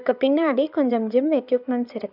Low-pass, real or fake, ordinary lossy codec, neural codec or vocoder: 5.4 kHz; fake; none; codec, 16 kHz in and 24 kHz out, 2.2 kbps, FireRedTTS-2 codec